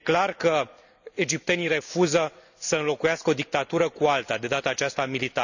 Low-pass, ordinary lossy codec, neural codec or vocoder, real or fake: 7.2 kHz; none; none; real